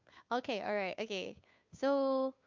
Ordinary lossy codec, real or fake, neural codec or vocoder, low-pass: MP3, 64 kbps; fake; codec, 16 kHz, 2 kbps, FunCodec, trained on Chinese and English, 25 frames a second; 7.2 kHz